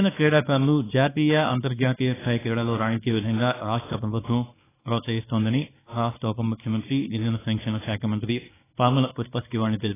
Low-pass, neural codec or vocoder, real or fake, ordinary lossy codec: 3.6 kHz; codec, 24 kHz, 0.9 kbps, WavTokenizer, small release; fake; AAC, 16 kbps